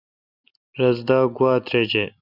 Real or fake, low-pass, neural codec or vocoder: real; 5.4 kHz; none